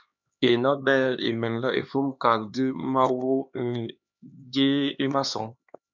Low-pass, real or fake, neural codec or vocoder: 7.2 kHz; fake; codec, 16 kHz, 2 kbps, X-Codec, HuBERT features, trained on LibriSpeech